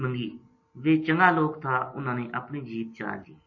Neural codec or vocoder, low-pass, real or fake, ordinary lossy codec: none; 7.2 kHz; real; MP3, 32 kbps